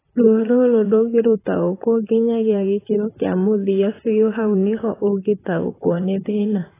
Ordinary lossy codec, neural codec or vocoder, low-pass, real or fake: AAC, 16 kbps; vocoder, 44.1 kHz, 128 mel bands, Pupu-Vocoder; 3.6 kHz; fake